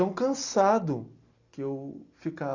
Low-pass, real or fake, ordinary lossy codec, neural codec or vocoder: 7.2 kHz; real; Opus, 64 kbps; none